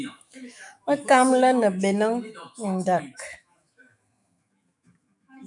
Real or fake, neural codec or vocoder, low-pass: fake; autoencoder, 48 kHz, 128 numbers a frame, DAC-VAE, trained on Japanese speech; 10.8 kHz